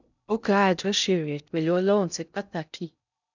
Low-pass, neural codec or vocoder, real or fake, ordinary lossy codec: 7.2 kHz; codec, 16 kHz in and 24 kHz out, 0.6 kbps, FocalCodec, streaming, 2048 codes; fake; none